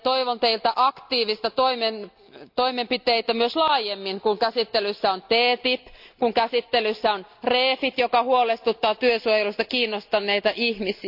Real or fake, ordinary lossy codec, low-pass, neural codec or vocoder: real; AAC, 48 kbps; 5.4 kHz; none